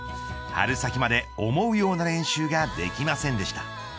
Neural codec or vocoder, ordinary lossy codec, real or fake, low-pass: none; none; real; none